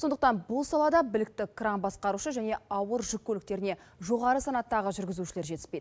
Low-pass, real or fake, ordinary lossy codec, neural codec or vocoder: none; real; none; none